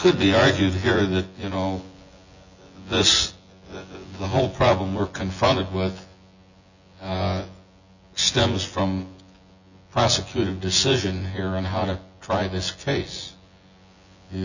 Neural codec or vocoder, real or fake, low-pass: vocoder, 24 kHz, 100 mel bands, Vocos; fake; 7.2 kHz